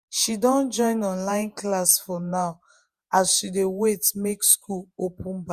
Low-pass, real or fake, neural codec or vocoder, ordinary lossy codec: 14.4 kHz; fake; vocoder, 48 kHz, 128 mel bands, Vocos; Opus, 64 kbps